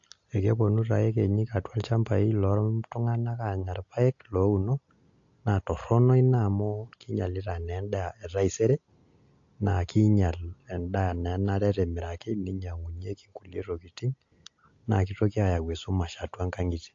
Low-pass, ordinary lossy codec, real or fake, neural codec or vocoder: 7.2 kHz; AAC, 48 kbps; real; none